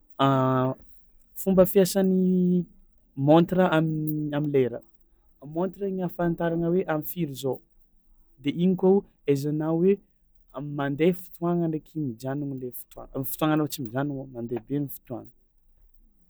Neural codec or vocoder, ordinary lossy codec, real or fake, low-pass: none; none; real; none